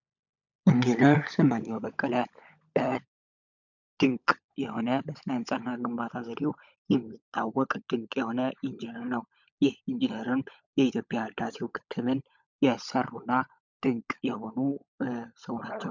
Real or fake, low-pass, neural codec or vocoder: fake; 7.2 kHz; codec, 16 kHz, 16 kbps, FunCodec, trained on LibriTTS, 50 frames a second